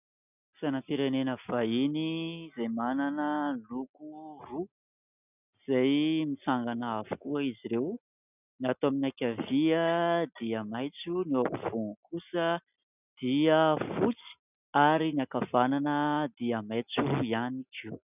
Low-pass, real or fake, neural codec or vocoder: 3.6 kHz; real; none